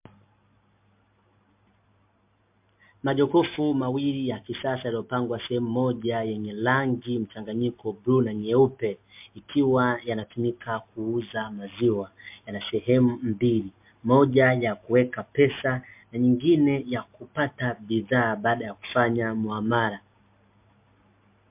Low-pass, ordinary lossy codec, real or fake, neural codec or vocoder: 3.6 kHz; MP3, 32 kbps; real; none